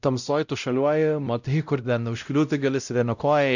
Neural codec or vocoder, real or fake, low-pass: codec, 16 kHz, 0.5 kbps, X-Codec, WavLM features, trained on Multilingual LibriSpeech; fake; 7.2 kHz